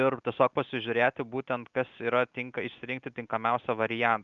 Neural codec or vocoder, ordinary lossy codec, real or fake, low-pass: none; Opus, 32 kbps; real; 7.2 kHz